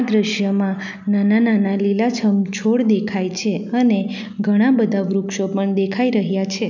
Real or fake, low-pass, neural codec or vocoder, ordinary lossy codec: real; 7.2 kHz; none; none